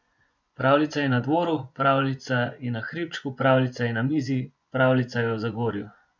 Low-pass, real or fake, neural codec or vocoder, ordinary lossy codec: 7.2 kHz; fake; vocoder, 24 kHz, 100 mel bands, Vocos; none